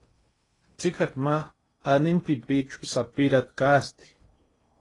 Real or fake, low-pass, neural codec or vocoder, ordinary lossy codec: fake; 10.8 kHz; codec, 16 kHz in and 24 kHz out, 0.8 kbps, FocalCodec, streaming, 65536 codes; AAC, 32 kbps